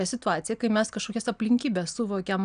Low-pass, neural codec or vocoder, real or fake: 9.9 kHz; none; real